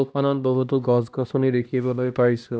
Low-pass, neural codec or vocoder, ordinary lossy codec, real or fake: none; codec, 16 kHz, 1 kbps, X-Codec, HuBERT features, trained on LibriSpeech; none; fake